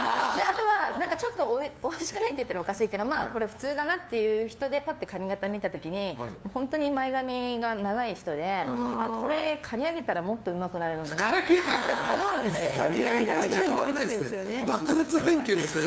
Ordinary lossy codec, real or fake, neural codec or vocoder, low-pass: none; fake; codec, 16 kHz, 2 kbps, FunCodec, trained on LibriTTS, 25 frames a second; none